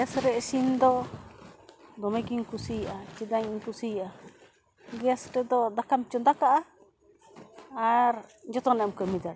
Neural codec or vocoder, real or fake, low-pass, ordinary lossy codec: none; real; none; none